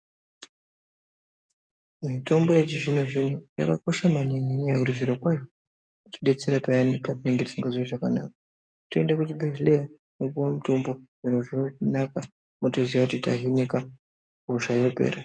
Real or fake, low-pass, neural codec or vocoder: fake; 9.9 kHz; codec, 44.1 kHz, 7.8 kbps, DAC